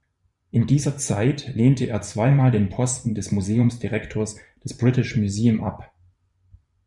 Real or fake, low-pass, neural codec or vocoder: fake; 10.8 kHz; vocoder, 24 kHz, 100 mel bands, Vocos